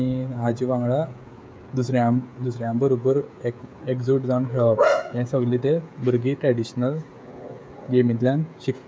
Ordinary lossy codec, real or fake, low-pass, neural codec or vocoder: none; fake; none; codec, 16 kHz, 16 kbps, FreqCodec, smaller model